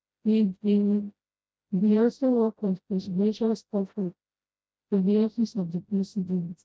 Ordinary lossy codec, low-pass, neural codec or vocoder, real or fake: none; none; codec, 16 kHz, 0.5 kbps, FreqCodec, smaller model; fake